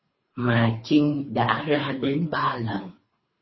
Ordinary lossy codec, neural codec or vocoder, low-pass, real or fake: MP3, 24 kbps; codec, 24 kHz, 3 kbps, HILCodec; 7.2 kHz; fake